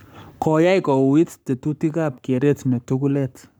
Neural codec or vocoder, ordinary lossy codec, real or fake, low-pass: codec, 44.1 kHz, 7.8 kbps, Pupu-Codec; none; fake; none